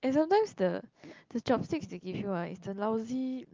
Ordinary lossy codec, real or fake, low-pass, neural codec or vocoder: Opus, 32 kbps; real; 7.2 kHz; none